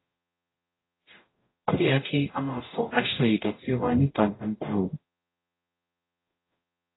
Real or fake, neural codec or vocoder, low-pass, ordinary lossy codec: fake; codec, 44.1 kHz, 0.9 kbps, DAC; 7.2 kHz; AAC, 16 kbps